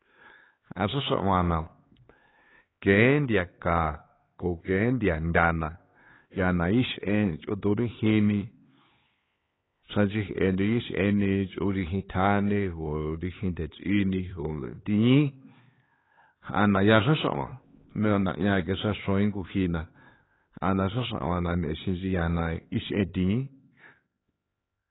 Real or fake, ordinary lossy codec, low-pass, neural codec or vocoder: fake; AAC, 16 kbps; 7.2 kHz; codec, 16 kHz, 4 kbps, X-Codec, HuBERT features, trained on LibriSpeech